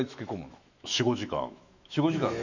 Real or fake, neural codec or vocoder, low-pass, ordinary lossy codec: fake; autoencoder, 48 kHz, 128 numbers a frame, DAC-VAE, trained on Japanese speech; 7.2 kHz; none